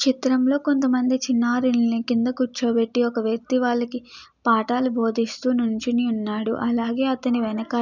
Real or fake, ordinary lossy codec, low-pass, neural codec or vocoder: real; none; 7.2 kHz; none